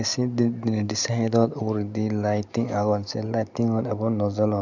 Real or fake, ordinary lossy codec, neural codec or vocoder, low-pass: real; none; none; 7.2 kHz